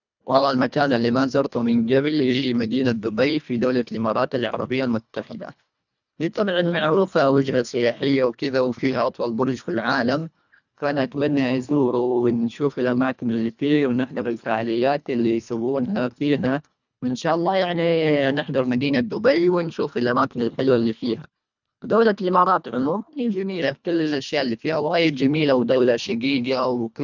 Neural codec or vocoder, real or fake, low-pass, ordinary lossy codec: codec, 24 kHz, 1.5 kbps, HILCodec; fake; 7.2 kHz; none